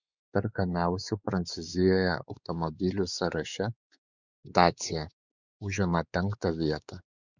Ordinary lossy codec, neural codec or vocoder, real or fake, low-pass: Opus, 64 kbps; codec, 16 kHz, 4 kbps, X-Codec, WavLM features, trained on Multilingual LibriSpeech; fake; 7.2 kHz